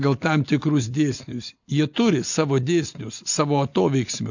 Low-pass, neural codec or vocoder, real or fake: 7.2 kHz; none; real